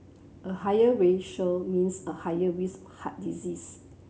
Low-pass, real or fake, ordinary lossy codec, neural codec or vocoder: none; real; none; none